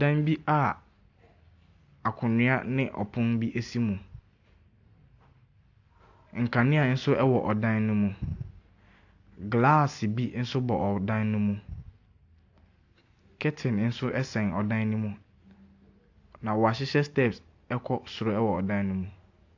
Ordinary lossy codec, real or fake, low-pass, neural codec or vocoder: AAC, 48 kbps; real; 7.2 kHz; none